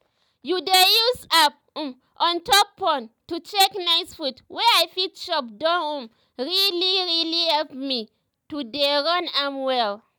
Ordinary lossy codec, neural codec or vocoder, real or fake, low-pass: none; none; real; none